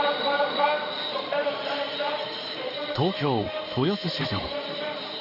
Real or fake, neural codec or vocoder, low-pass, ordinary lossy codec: fake; codec, 16 kHz in and 24 kHz out, 1 kbps, XY-Tokenizer; 5.4 kHz; none